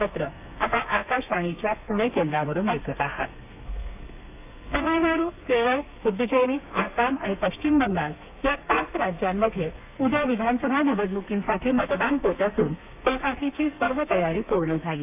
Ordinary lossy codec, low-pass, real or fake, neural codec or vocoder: AAC, 32 kbps; 3.6 kHz; fake; codec, 32 kHz, 1.9 kbps, SNAC